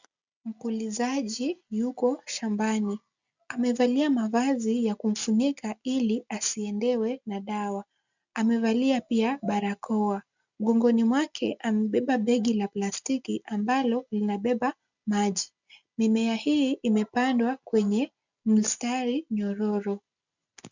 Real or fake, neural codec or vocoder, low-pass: real; none; 7.2 kHz